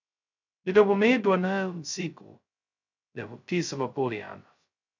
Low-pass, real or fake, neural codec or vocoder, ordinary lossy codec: 7.2 kHz; fake; codec, 16 kHz, 0.2 kbps, FocalCodec; MP3, 64 kbps